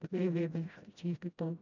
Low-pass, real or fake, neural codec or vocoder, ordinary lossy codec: 7.2 kHz; fake; codec, 16 kHz, 0.5 kbps, FreqCodec, smaller model; none